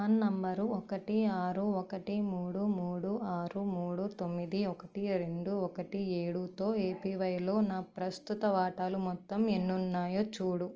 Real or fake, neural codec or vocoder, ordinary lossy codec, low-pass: real; none; Opus, 24 kbps; 7.2 kHz